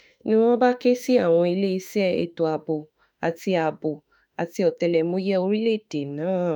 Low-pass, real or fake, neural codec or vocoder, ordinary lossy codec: none; fake; autoencoder, 48 kHz, 32 numbers a frame, DAC-VAE, trained on Japanese speech; none